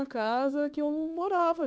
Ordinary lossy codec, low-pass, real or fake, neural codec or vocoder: none; none; fake; codec, 16 kHz, 4 kbps, X-Codec, HuBERT features, trained on LibriSpeech